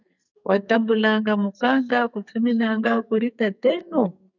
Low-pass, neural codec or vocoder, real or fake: 7.2 kHz; codec, 44.1 kHz, 2.6 kbps, SNAC; fake